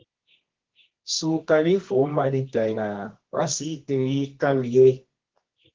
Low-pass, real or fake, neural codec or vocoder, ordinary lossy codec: 7.2 kHz; fake; codec, 24 kHz, 0.9 kbps, WavTokenizer, medium music audio release; Opus, 16 kbps